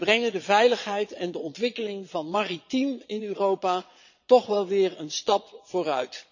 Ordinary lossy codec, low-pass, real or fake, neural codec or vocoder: none; 7.2 kHz; fake; vocoder, 22.05 kHz, 80 mel bands, Vocos